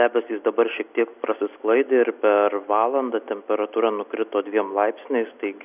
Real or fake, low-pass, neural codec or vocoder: real; 3.6 kHz; none